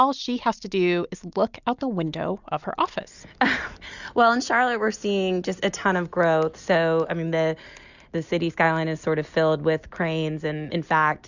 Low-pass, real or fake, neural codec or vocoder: 7.2 kHz; real; none